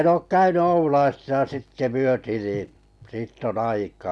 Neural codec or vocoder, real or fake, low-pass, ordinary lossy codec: none; real; none; none